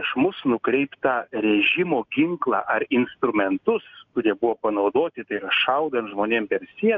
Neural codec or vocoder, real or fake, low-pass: none; real; 7.2 kHz